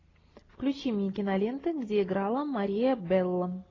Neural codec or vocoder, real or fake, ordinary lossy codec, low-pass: none; real; AAC, 32 kbps; 7.2 kHz